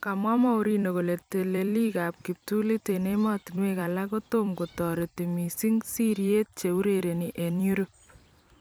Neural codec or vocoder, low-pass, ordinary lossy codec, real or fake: vocoder, 44.1 kHz, 128 mel bands every 256 samples, BigVGAN v2; none; none; fake